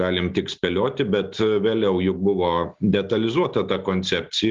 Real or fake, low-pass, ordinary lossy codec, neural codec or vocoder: real; 7.2 kHz; Opus, 32 kbps; none